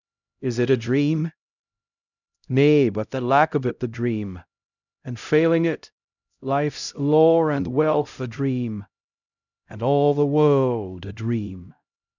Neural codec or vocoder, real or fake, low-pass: codec, 16 kHz, 0.5 kbps, X-Codec, HuBERT features, trained on LibriSpeech; fake; 7.2 kHz